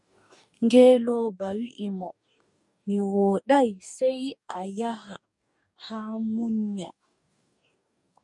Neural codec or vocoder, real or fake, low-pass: codec, 44.1 kHz, 2.6 kbps, DAC; fake; 10.8 kHz